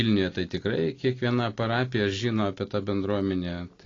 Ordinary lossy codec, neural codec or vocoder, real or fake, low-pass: AAC, 32 kbps; none; real; 7.2 kHz